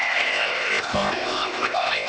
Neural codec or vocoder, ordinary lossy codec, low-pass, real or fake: codec, 16 kHz, 0.8 kbps, ZipCodec; none; none; fake